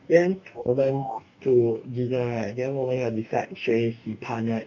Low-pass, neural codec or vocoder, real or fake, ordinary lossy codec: 7.2 kHz; codec, 44.1 kHz, 2.6 kbps, DAC; fake; none